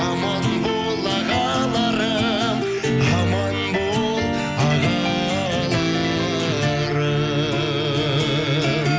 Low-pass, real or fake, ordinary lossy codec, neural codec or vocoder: none; real; none; none